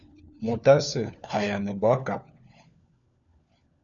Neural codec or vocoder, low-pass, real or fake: codec, 16 kHz, 4 kbps, FunCodec, trained on LibriTTS, 50 frames a second; 7.2 kHz; fake